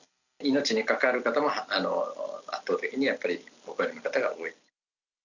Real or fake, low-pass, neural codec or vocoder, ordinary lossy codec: real; 7.2 kHz; none; none